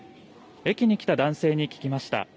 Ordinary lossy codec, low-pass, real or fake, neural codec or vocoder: none; none; real; none